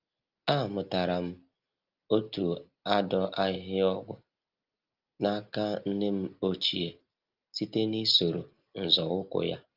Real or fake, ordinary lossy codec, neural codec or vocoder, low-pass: real; Opus, 24 kbps; none; 5.4 kHz